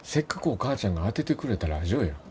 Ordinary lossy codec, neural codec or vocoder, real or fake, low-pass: none; none; real; none